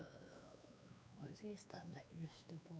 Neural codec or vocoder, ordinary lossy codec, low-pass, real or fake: codec, 16 kHz, 2 kbps, X-Codec, WavLM features, trained on Multilingual LibriSpeech; none; none; fake